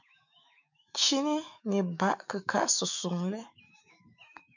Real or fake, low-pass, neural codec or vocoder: fake; 7.2 kHz; autoencoder, 48 kHz, 128 numbers a frame, DAC-VAE, trained on Japanese speech